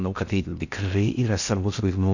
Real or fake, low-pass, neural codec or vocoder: fake; 7.2 kHz; codec, 16 kHz in and 24 kHz out, 0.6 kbps, FocalCodec, streaming, 4096 codes